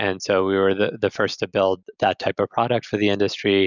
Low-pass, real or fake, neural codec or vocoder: 7.2 kHz; real; none